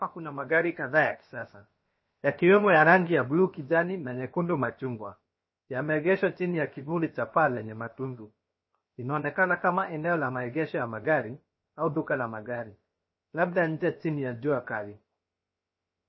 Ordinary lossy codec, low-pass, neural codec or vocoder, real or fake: MP3, 24 kbps; 7.2 kHz; codec, 16 kHz, 0.7 kbps, FocalCodec; fake